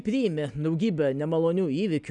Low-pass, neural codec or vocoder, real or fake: 10.8 kHz; none; real